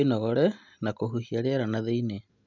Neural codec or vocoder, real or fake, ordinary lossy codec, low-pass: none; real; none; 7.2 kHz